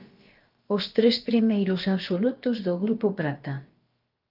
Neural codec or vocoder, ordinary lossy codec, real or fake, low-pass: codec, 16 kHz, about 1 kbps, DyCAST, with the encoder's durations; Opus, 32 kbps; fake; 5.4 kHz